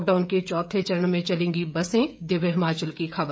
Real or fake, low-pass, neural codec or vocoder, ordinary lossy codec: fake; none; codec, 16 kHz, 16 kbps, FreqCodec, smaller model; none